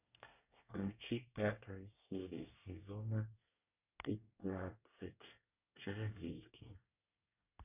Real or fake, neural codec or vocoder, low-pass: fake; codec, 24 kHz, 1 kbps, SNAC; 3.6 kHz